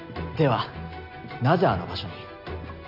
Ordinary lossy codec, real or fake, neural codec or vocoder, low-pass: none; real; none; 5.4 kHz